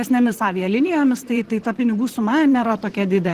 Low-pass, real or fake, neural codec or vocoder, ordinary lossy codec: 14.4 kHz; fake; vocoder, 44.1 kHz, 128 mel bands, Pupu-Vocoder; Opus, 16 kbps